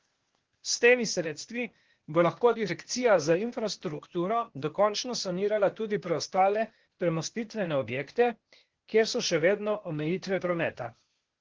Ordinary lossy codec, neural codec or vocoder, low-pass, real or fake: Opus, 16 kbps; codec, 16 kHz, 0.8 kbps, ZipCodec; 7.2 kHz; fake